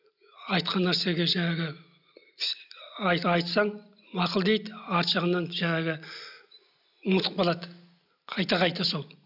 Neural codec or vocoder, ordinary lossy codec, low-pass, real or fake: none; none; 5.4 kHz; real